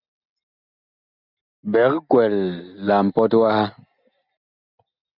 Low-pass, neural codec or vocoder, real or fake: 5.4 kHz; none; real